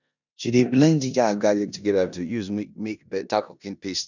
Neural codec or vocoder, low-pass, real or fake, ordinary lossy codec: codec, 16 kHz in and 24 kHz out, 0.9 kbps, LongCat-Audio-Codec, four codebook decoder; 7.2 kHz; fake; none